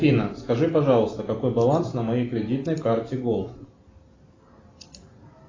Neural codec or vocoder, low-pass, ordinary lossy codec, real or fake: none; 7.2 kHz; AAC, 32 kbps; real